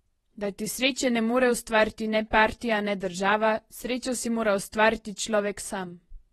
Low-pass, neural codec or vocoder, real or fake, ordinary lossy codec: 19.8 kHz; vocoder, 48 kHz, 128 mel bands, Vocos; fake; AAC, 32 kbps